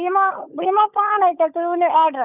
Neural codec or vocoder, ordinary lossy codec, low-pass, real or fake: codec, 16 kHz, 16 kbps, FunCodec, trained on LibriTTS, 50 frames a second; none; 3.6 kHz; fake